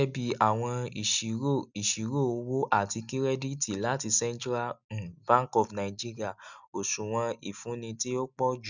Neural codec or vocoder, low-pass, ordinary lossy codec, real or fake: none; 7.2 kHz; none; real